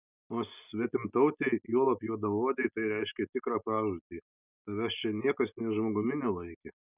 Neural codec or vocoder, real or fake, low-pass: none; real; 3.6 kHz